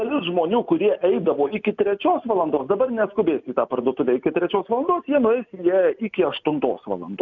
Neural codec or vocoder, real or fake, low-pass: none; real; 7.2 kHz